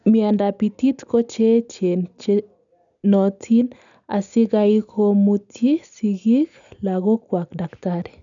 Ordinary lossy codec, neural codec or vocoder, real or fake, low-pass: none; none; real; 7.2 kHz